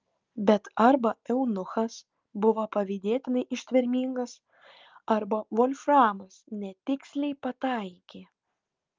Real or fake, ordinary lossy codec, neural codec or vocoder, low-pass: real; Opus, 24 kbps; none; 7.2 kHz